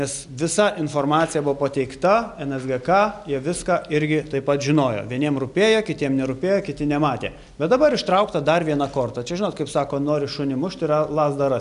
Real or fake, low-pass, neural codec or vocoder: real; 10.8 kHz; none